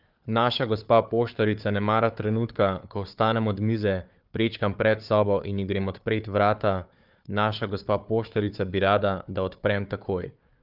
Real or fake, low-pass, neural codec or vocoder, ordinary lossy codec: fake; 5.4 kHz; codec, 16 kHz, 4 kbps, FunCodec, trained on Chinese and English, 50 frames a second; Opus, 24 kbps